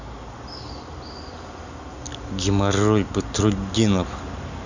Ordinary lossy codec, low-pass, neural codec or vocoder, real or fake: none; 7.2 kHz; none; real